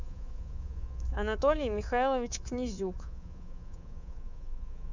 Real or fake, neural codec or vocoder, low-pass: fake; codec, 24 kHz, 3.1 kbps, DualCodec; 7.2 kHz